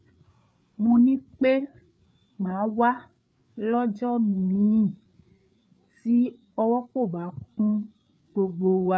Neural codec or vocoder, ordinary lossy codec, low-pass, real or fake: codec, 16 kHz, 8 kbps, FreqCodec, larger model; none; none; fake